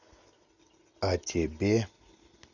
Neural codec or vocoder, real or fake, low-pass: none; real; 7.2 kHz